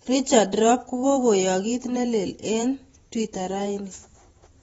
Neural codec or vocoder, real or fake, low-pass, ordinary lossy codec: vocoder, 48 kHz, 128 mel bands, Vocos; fake; 19.8 kHz; AAC, 24 kbps